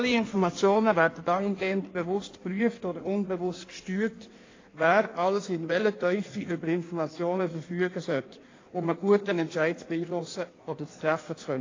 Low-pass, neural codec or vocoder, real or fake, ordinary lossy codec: 7.2 kHz; codec, 16 kHz in and 24 kHz out, 1.1 kbps, FireRedTTS-2 codec; fake; AAC, 32 kbps